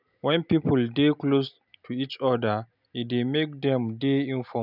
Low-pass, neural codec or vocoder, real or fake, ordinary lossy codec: 5.4 kHz; none; real; none